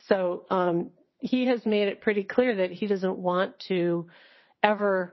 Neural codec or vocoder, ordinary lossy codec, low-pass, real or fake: vocoder, 22.05 kHz, 80 mel bands, WaveNeXt; MP3, 24 kbps; 7.2 kHz; fake